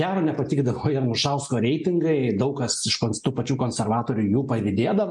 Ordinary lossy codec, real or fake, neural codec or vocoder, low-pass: MP3, 64 kbps; real; none; 10.8 kHz